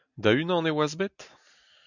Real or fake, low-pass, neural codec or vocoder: real; 7.2 kHz; none